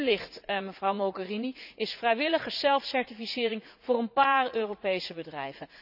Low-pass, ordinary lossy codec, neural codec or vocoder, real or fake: 5.4 kHz; none; vocoder, 44.1 kHz, 80 mel bands, Vocos; fake